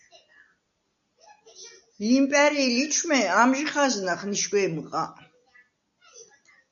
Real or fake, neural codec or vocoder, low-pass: real; none; 7.2 kHz